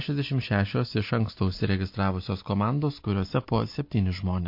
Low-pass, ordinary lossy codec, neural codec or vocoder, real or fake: 5.4 kHz; MP3, 32 kbps; none; real